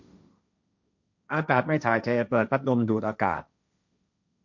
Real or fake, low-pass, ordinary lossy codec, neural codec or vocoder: fake; 7.2 kHz; none; codec, 16 kHz, 1.1 kbps, Voila-Tokenizer